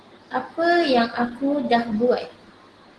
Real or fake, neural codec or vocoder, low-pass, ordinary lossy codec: real; none; 10.8 kHz; Opus, 16 kbps